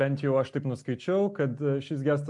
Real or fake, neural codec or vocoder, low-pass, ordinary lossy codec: real; none; 10.8 kHz; MP3, 64 kbps